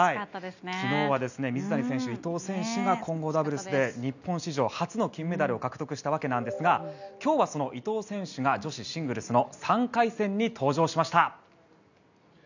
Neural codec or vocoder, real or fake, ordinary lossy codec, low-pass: none; real; none; 7.2 kHz